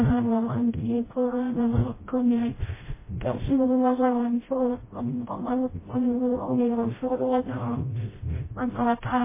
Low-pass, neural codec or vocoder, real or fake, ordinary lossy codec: 3.6 kHz; codec, 16 kHz, 0.5 kbps, FreqCodec, smaller model; fake; MP3, 16 kbps